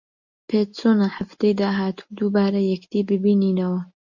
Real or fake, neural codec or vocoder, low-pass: real; none; 7.2 kHz